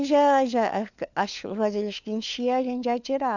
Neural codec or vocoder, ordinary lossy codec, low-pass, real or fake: codec, 16 kHz, 4 kbps, FunCodec, trained on LibriTTS, 50 frames a second; none; 7.2 kHz; fake